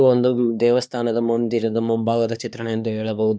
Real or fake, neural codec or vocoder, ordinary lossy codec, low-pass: fake; codec, 16 kHz, 2 kbps, X-Codec, HuBERT features, trained on LibriSpeech; none; none